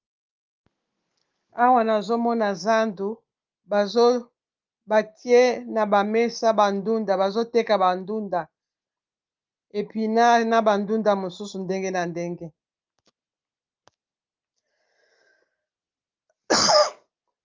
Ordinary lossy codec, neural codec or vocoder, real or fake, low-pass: Opus, 32 kbps; none; real; 7.2 kHz